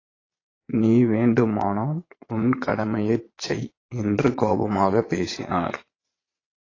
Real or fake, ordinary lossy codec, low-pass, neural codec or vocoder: fake; AAC, 32 kbps; 7.2 kHz; vocoder, 22.05 kHz, 80 mel bands, Vocos